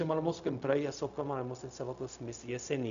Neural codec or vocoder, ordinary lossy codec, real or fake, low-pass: codec, 16 kHz, 0.4 kbps, LongCat-Audio-Codec; MP3, 96 kbps; fake; 7.2 kHz